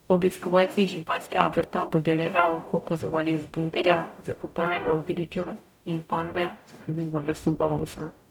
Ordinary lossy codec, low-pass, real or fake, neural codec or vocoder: none; 19.8 kHz; fake; codec, 44.1 kHz, 0.9 kbps, DAC